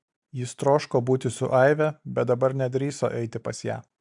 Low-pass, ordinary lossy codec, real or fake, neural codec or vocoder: 10.8 kHz; MP3, 96 kbps; real; none